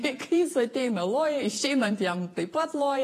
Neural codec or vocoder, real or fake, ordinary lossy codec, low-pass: vocoder, 48 kHz, 128 mel bands, Vocos; fake; AAC, 48 kbps; 14.4 kHz